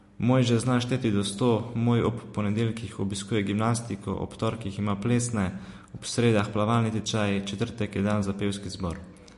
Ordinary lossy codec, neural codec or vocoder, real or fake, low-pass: MP3, 48 kbps; none; real; 14.4 kHz